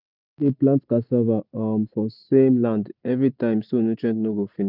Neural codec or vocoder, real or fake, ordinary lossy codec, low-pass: none; real; none; 5.4 kHz